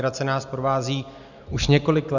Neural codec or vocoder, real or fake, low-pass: none; real; 7.2 kHz